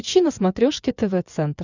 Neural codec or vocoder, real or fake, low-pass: vocoder, 24 kHz, 100 mel bands, Vocos; fake; 7.2 kHz